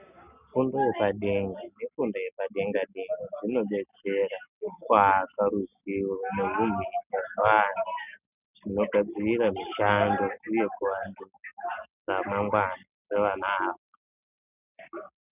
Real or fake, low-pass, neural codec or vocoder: real; 3.6 kHz; none